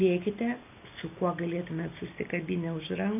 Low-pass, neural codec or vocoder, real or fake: 3.6 kHz; none; real